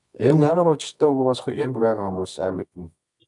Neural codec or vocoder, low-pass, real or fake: codec, 24 kHz, 0.9 kbps, WavTokenizer, medium music audio release; 10.8 kHz; fake